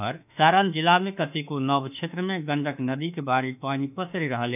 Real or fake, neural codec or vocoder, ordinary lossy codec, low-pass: fake; autoencoder, 48 kHz, 32 numbers a frame, DAC-VAE, trained on Japanese speech; none; 3.6 kHz